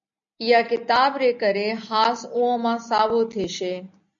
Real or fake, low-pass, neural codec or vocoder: real; 7.2 kHz; none